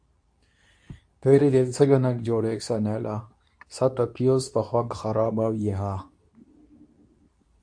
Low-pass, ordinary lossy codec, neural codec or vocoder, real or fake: 9.9 kHz; AAC, 64 kbps; codec, 24 kHz, 0.9 kbps, WavTokenizer, medium speech release version 2; fake